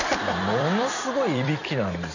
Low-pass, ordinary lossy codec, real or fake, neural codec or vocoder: 7.2 kHz; none; fake; vocoder, 44.1 kHz, 128 mel bands every 256 samples, BigVGAN v2